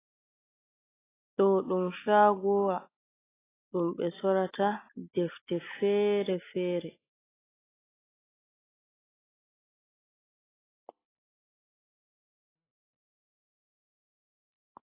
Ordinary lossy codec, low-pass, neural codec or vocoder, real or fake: AAC, 16 kbps; 3.6 kHz; none; real